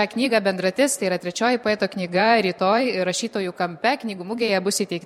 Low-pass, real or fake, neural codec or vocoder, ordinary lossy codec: 19.8 kHz; fake; vocoder, 44.1 kHz, 128 mel bands every 512 samples, BigVGAN v2; MP3, 64 kbps